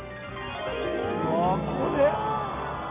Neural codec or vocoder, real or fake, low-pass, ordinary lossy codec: none; real; 3.6 kHz; none